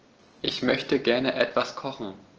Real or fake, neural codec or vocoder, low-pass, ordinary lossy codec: real; none; 7.2 kHz; Opus, 16 kbps